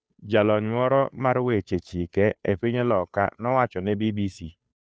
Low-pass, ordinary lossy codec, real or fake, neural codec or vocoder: none; none; fake; codec, 16 kHz, 2 kbps, FunCodec, trained on Chinese and English, 25 frames a second